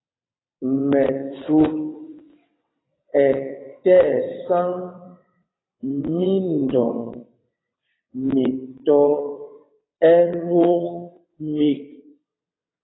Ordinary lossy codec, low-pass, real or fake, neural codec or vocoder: AAC, 16 kbps; 7.2 kHz; fake; vocoder, 22.05 kHz, 80 mel bands, WaveNeXt